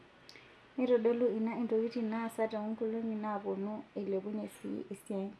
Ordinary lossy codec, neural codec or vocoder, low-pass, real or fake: none; none; none; real